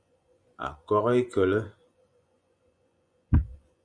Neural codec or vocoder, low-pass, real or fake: vocoder, 24 kHz, 100 mel bands, Vocos; 9.9 kHz; fake